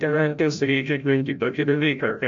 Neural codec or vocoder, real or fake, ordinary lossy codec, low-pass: codec, 16 kHz, 0.5 kbps, FreqCodec, larger model; fake; AAC, 64 kbps; 7.2 kHz